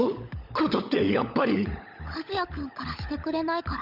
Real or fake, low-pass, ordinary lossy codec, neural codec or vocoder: fake; 5.4 kHz; none; codec, 16 kHz, 16 kbps, FunCodec, trained on LibriTTS, 50 frames a second